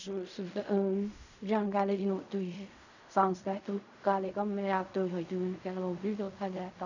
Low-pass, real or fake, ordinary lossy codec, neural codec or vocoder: 7.2 kHz; fake; none; codec, 16 kHz in and 24 kHz out, 0.4 kbps, LongCat-Audio-Codec, fine tuned four codebook decoder